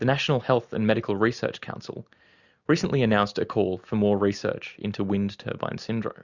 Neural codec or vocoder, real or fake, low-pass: none; real; 7.2 kHz